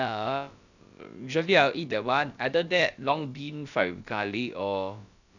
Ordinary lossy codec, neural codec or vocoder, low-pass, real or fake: none; codec, 16 kHz, about 1 kbps, DyCAST, with the encoder's durations; 7.2 kHz; fake